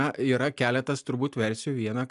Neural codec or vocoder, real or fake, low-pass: none; real; 10.8 kHz